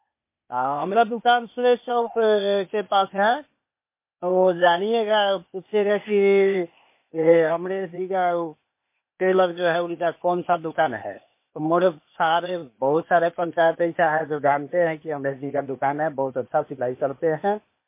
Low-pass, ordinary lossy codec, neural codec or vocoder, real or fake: 3.6 kHz; MP3, 24 kbps; codec, 16 kHz, 0.8 kbps, ZipCodec; fake